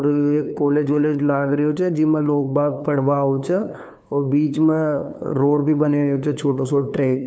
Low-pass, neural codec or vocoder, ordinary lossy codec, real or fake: none; codec, 16 kHz, 2 kbps, FunCodec, trained on LibriTTS, 25 frames a second; none; fake